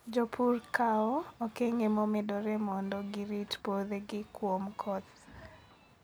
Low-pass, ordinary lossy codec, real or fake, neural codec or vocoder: none; none; real; none